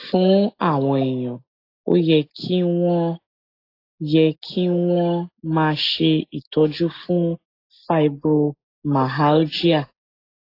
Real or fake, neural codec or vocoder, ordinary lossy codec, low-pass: real; none; AAC, 32 kbps; 5.4 kHz